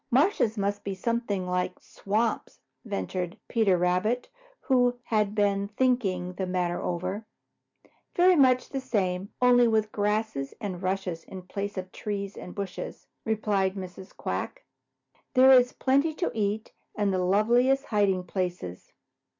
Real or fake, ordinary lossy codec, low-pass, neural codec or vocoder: real; MP3, 64 kbps; 7.2 kHz; none